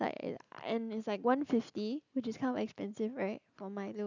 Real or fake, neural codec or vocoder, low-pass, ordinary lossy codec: real; none; 7.2 kHz; none